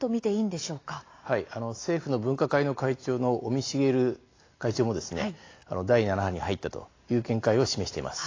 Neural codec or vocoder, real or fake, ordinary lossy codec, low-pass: none; real; AAC, 32 kbps; 7.2 kHz